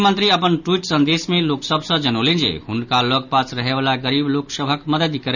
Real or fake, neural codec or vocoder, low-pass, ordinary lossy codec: real; none; 7.2 kHz; none